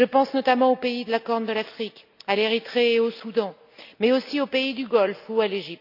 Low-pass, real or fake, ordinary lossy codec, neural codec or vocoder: 5.4 kHz; real; none; none